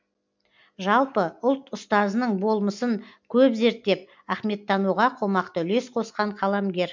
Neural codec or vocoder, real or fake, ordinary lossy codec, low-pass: none; real; MP3, 64 kbps; 7.2 kHz